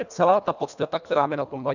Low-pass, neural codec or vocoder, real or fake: 7.2 kHz; codec, 24 kHz, 1.5 kbps, HILCodec; fake